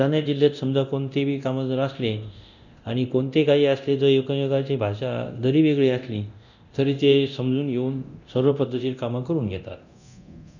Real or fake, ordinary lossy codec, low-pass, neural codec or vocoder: fake; none; 7.2 kHz; codec, 24 kHz, 0.9 kbps, DualCodec